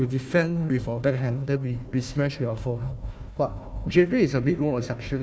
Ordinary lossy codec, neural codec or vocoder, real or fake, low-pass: none; codec, 16 kHz, 1 kbps, FunCodec, trained on Chinese and English, 50 frames a second; fake; none